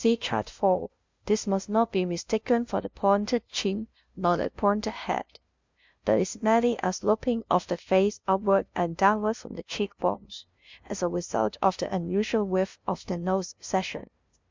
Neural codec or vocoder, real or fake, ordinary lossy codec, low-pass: codec, 16 kHz, 0.5 kbps, FunCodec, trained on LibriTTS, 25 frames a second; fake; MP3, 64 kbps; 7.2 kHz